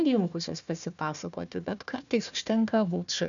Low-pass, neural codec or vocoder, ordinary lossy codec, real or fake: 7.2 kHz; codec, 16 kHz, 1 kbps, FunCodec, trained on Chinese and English, 50 frames a second; MP3, 96 kbps; fake